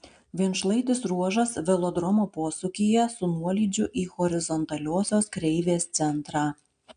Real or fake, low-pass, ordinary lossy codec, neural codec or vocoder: real; 9.9 kHz; AAC, 96 kbps; none